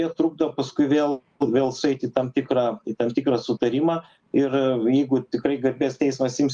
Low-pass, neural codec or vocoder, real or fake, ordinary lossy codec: 9.9 kHz; none; real; Opus, 32 kbps